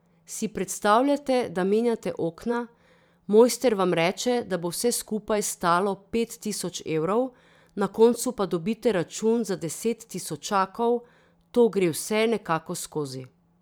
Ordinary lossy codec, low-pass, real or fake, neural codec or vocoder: none; none; fake; vocoder, 44.1 kHz, 128 mel bands every 512 samples, BigVGAN v2